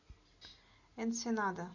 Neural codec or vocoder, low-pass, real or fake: none; 7.2 kHz; real